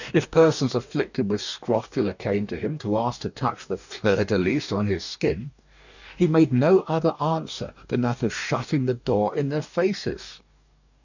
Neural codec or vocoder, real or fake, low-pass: codec, 44.1 kHz, 2.6 kbps, DAC; fake; 7.2 kHz